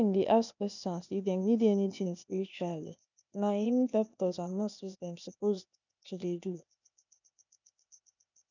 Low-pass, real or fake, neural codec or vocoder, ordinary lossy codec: 7.2 kHz; fake; codec, 16 kHz, 0.8 kbps, ZipCodec; none